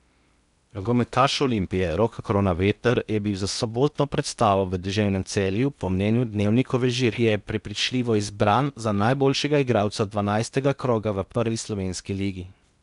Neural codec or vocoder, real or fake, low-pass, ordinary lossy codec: codec, 16 kHz in and 24 kHz out, 0.8 kbps, FocalCodec, streaming, 65536 codes; fake; 10.8 kHz; none